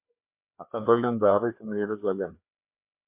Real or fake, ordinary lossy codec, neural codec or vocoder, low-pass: fake; MP3, 32 kbps; codec, 16 kHz, 2 kbps, FreqCodec, larger model; 3.6 kHz